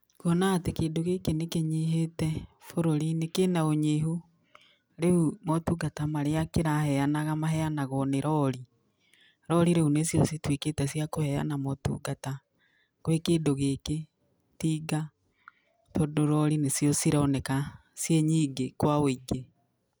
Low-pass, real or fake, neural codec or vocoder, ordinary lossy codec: none; real; none; none